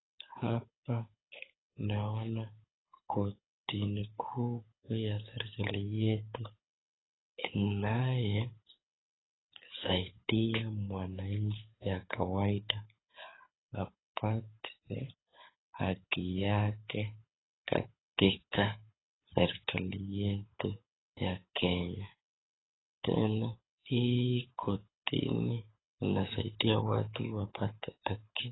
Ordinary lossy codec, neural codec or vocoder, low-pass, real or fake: AAC, 16 kbps; codec, 24 kHz, 6 kbps, HILCodec; 7.2 kHz; fake